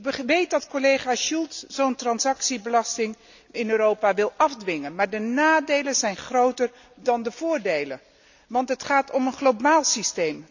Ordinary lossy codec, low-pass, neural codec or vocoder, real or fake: none; 7.2 kHz; none; real